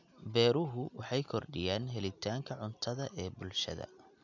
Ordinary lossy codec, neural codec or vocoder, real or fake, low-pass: none; none; real; 7.2 kHz